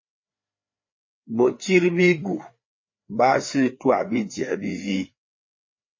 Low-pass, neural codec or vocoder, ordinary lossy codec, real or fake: 7.2 kHz; codec, 16 kHz, 4 kbps, FreqCodec, larger model; MP3, 32 kbps; fake